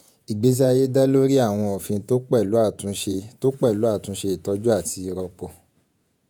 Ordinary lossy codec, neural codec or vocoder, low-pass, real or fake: none; vocoder, 48 kHz, 128 mel bands, Vocos; none; fake